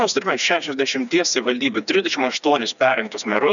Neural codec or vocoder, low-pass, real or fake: codec, 16 kHz, 2 kbps, FreqCodec, smaller model; 7.2 kHz; fake